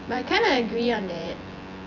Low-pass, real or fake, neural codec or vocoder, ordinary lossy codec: 7.2 kHz; fake; vocoder, 24 kHz, 100 mel bands, Vocos; none